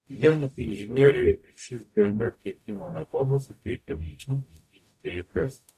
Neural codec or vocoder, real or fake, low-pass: codec, 44.1 kHz, 0.9 kbps, DAC; fake; 14.4 kHz